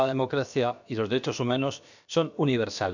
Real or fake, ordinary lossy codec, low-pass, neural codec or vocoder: fake; none; 7.2 kHz; codec, 16 kHz, about 1 kbps, DyCAST, with the encoder's durations